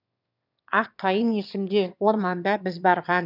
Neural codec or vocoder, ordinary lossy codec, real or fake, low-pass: autoencoder, 22.05 kHz, a latent of 192 numbers a frame, VITS, trained on one speaker; none; fake; 5.4 kHz